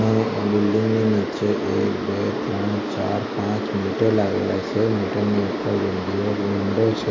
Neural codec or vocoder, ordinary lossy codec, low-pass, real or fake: none; AAC, 32 kbps; 7.2 kHz; real